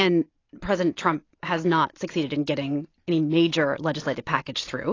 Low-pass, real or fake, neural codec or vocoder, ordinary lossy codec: 7.2 kHz; real; none; AAC, 32 kbps